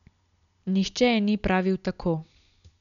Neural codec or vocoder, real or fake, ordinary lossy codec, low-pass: none; real; none; 7.2 kHz